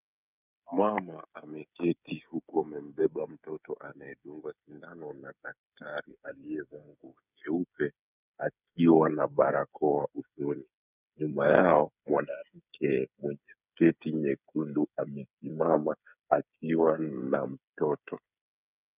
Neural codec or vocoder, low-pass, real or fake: codec, 16 kHz, 8 kbps, FreqCodec, smaller model; 3.6 kHz; fake